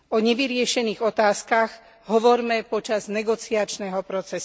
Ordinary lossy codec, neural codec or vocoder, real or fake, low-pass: none; none; real; none